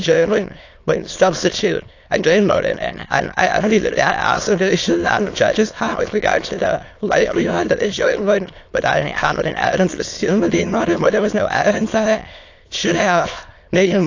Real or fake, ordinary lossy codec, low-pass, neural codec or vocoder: fake; AAC, 48 kbps; 7.2 kHz; autoencoder, 22.05 kHz, a latent of 192 numbers a frame, VITS, trained on many speakers